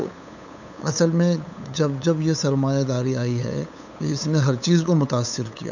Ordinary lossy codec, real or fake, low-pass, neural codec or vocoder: none; fake; 7.2 kHz; codec, 16 kHz, 8 kbps, FunCodec, trained on LibriTTS, 25 frames a second